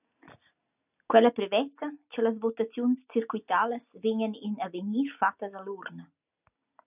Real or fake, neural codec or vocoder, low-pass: real; none; 3.6 kHz